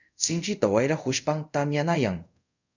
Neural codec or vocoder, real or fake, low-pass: codec, 24 kHz, 0.5 kbps, DualCodec; fake; 7.2 kHz